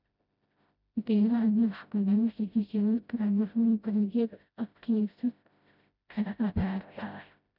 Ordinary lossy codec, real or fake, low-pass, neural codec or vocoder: none; fake; 5.4 kHz; codec, 16 kHz, 0.5 kbps, FreqCodec, smaller model